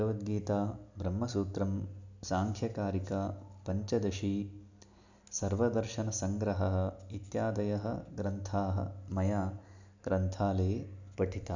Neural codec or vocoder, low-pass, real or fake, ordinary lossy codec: none; 7.2 kHz; real; none